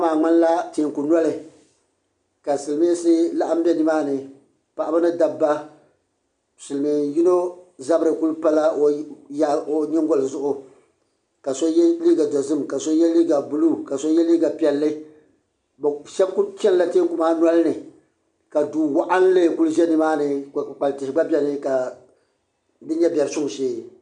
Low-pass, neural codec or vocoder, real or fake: 10.8 kHz; none; real